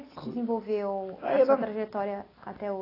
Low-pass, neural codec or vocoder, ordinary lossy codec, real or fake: 5.4 kHz; none; AAC, 24 kbps; real